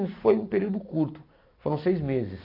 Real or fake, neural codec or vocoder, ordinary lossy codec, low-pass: real; none; AAC, 32 kbps; 5.4 kHz